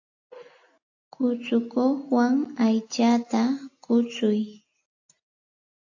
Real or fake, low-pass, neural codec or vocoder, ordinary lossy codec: real; 7.2 kHz; none; AAC, 48 kbps